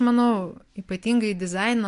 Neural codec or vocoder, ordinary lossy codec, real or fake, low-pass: none; MP3, 64 kbps; real; 10.8 kHz